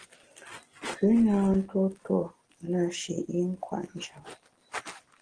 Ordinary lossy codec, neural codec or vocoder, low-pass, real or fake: Opus, 16 kbps; none; 9.9 kHz; real